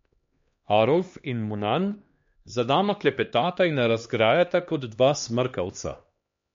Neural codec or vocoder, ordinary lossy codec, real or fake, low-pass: codec, 16 kHz, 2 kbps, X-Codec, HuBERT features, trained on LibriSpeech; MP3, 48 kbps; fake; 7.2 kHz